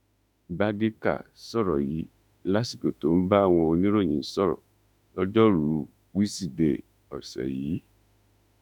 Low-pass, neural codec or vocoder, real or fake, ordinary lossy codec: 19.8 kHz; autoencoder, 48 kHz, 32 numbers a frame, DAC-VAE, trained on Japanese speech; fake; none